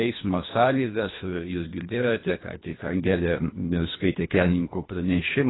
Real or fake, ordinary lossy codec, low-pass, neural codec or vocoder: fake; AAC, 16 kbps; 7.2 kHz; codec, 24 kHz, 1.5 kbps, HILCodec